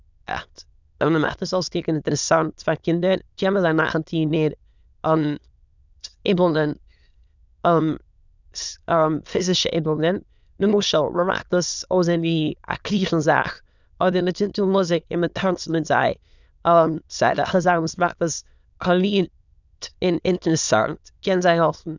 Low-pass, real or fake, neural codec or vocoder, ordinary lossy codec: 7.2 kHz; fake; autoencoder, 22.05 kHz, a latent of 192 numbers a frame, VITS, trained on many speakers; none